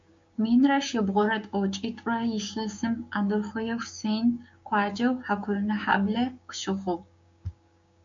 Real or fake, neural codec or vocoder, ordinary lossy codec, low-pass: fake; codec, 16 kHz, 6 kbps, DAC; MP3, 48 kbps; 7.2 kHz